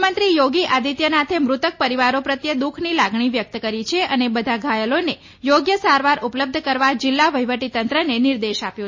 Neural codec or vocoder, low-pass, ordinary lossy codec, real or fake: none; 7.2 kHz; MP3, 32 kbps; real